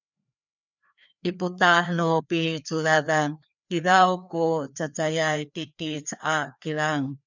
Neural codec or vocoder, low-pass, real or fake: codec, 16 kHz, 2 kbps, FreqCodec, larger model; 7.2 kHz; fake